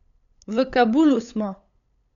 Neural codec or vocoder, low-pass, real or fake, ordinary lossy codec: codec, 16 kHz, 8 kbps, FunCodec, trained on LibriTTS, 25 frames a second; 7.2 kHz; fake; none